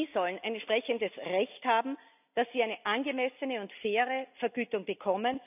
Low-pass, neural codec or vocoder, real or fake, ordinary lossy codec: 3.6 kHz; none; real; none